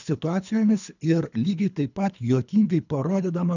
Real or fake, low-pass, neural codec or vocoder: fake; 7.2 kHz; codec, 24 kHz, 3 kbps, HILCodec